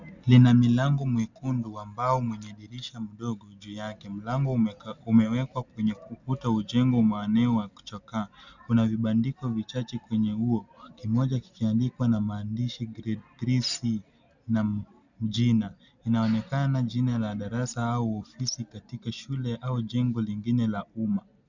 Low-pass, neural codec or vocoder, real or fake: 7.2 kHz; none; real